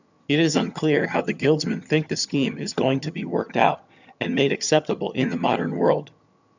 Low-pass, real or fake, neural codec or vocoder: 7.2 kHz; fake; vocoder, 22.05 kHz, 80 mel bands, HiFi-GAN